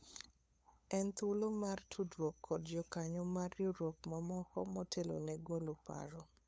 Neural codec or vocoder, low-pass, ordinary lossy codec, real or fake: codec, 16 kHz, 8 kbps, FunCodec, trained on LibriTTS, 25 frames a second; none; none; fake